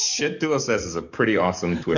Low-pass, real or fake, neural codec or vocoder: 7.2 kHz; fake; codec, 44.1 kHz, 7.8 kbps, DAC